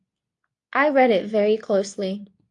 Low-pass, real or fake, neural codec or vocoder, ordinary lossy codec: 10.8 kHz; fake; codec, 24 kHz, 0.9 kbps, WavTokenizer, medium speech release version 2; AAC, 64 kbps